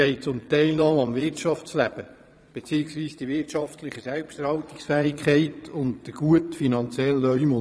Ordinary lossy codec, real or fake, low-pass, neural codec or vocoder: none; fake; none; vocoder, 22.05 kHz, 80 mel bands, Vocos